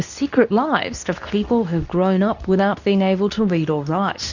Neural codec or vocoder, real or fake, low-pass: codec, 24 kHz, 0.9 kbps, WavTokenizer, medium speech release version 2; fake; 7.2 kHz